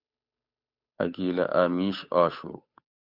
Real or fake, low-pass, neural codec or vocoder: fake; 5.4 kHz; codec, 16 kHz, 8 kbps, FunCodec, trained on Chinese and English, 25 frames a second